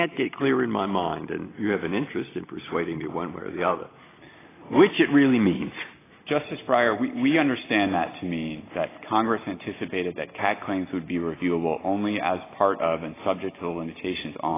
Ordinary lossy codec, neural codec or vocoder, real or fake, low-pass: AAC, 16 kbps; none; real; 3.6 kHz